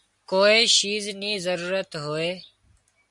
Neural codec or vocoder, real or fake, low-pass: none; real; 10.8 kHz